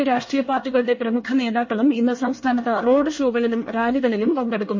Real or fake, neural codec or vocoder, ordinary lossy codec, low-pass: fake; codec, 24 kHz, 1 kbps, SNAC; MP3, 32 kbps; 7.2 kHz